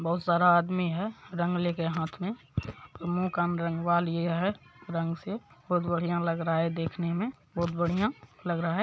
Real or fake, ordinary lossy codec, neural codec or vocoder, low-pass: real; none; none; none